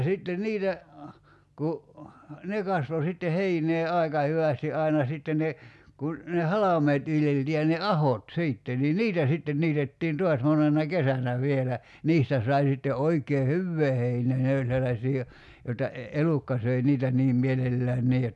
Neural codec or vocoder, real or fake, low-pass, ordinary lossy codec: none; real; none; none